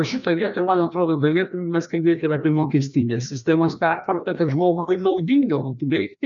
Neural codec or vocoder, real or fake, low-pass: codec, 16 kHz, 1 kbps, FreqCodec, larger model; fake; 7.2 kHz